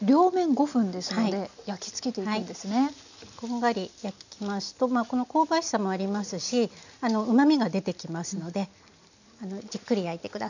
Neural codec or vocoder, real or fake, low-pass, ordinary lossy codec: none; real; 7.2 kHz; none